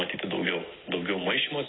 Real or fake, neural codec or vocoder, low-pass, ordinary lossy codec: real; none; 7.2 kHz; AAC, 16 kbps